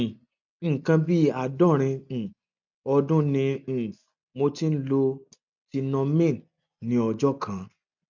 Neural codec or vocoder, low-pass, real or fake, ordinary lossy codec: none; 7.2 kHz; real; none